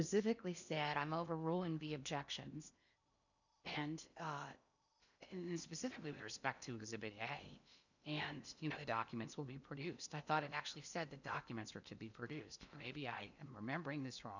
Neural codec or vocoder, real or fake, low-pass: codec, 16 kHz in and 24 kHz out, 0.8 kbps, FocalCodec, streaming, 65536 codes; fake; 7.2 kHz